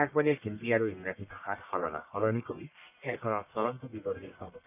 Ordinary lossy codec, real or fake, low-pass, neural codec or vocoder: none; fake; 3.6 kHz; codec, 44.1 kHz, 1.7 kbps, Pupu-Codec